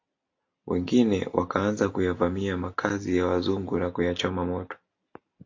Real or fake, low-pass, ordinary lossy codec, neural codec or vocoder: real; 7.2 kHz; AAC, 48 kbps; none